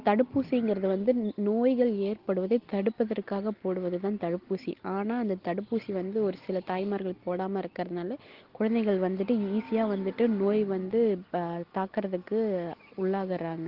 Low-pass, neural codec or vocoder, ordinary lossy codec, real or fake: 5.4 kHz; none; Opus, 16 kbps; real